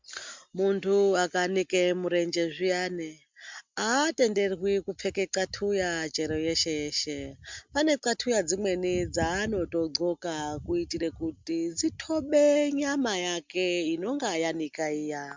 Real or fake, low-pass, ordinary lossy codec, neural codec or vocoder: real; 7.2 kHz; MP3, 64 kbps; none